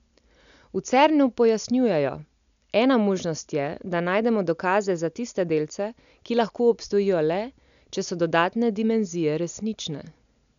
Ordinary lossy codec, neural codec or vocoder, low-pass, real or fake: none; none; 7.2 kHz; real